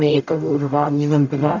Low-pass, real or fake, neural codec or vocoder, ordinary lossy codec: 7.2 kHz; fake; codec, 44.1 kHz, 0.9 kbps, DAC; none